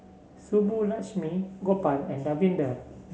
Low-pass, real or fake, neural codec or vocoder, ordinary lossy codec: none; real; none; none